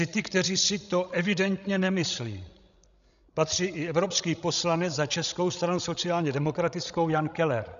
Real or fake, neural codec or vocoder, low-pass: fake; codec, 16 kHz, 16 kbps, FreqCodec, larger model; 7.2 kHz